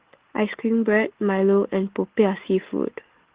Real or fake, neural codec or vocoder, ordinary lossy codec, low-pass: real; none; Opus, 16 kbps; 3.6 kHz